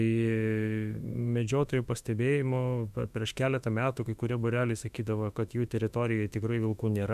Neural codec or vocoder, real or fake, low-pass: autoencoder, 48 kHz, 32 numbers a frame, DAC-VAE, trained on Japanese speech; fake; 14.4 kHz